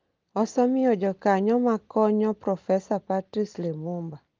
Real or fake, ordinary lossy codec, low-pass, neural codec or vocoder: real; Opus, 32 kbps; 7.2 kHz; none